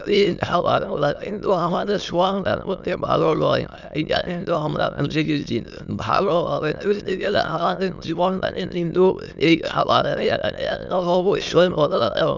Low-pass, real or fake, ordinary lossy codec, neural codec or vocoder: 7.2 kHz; fake; none; autoencoder, 22.05 kHz, a latent of 192 numbers a frame, VITS, trained on many speakers